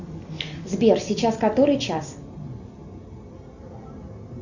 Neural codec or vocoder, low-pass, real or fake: none; 7.2 kHz; real